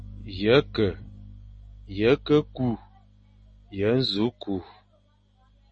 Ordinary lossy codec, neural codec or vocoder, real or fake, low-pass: MP3, 32 kbps; none; real; 10.8 kHz